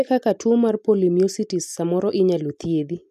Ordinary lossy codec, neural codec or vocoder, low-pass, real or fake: none; none; 14.4 kHz; real